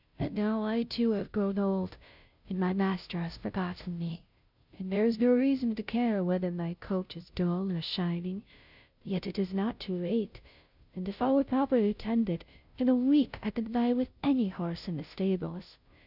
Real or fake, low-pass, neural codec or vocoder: fake; 5.4 kHz; codec, 16 kHz, 0.5 kbps, FunCodec, trained on Chinese and English, 25 frames a second